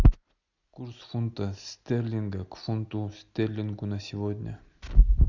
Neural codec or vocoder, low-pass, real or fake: none; 7.2 kHz; real